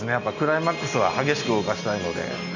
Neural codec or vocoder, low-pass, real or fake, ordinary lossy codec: none; 7.2 kHz; real; none